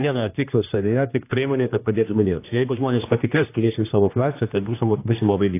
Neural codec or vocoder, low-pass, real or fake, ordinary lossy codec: codec, 16 kHz, 1 kbps, X-Codec, HuBERT features, trained on general audio; 3.6 kHz; fake; AAC, 24 kbps